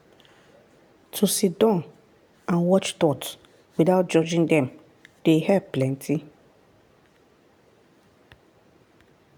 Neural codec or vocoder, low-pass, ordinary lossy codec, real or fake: none; none; none; real